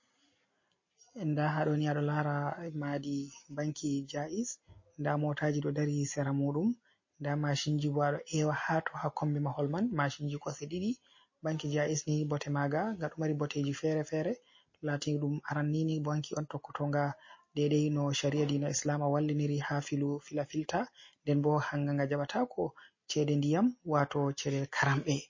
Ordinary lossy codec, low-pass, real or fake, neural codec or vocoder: MP3, 32 kbps; 7.2 kHz; real; none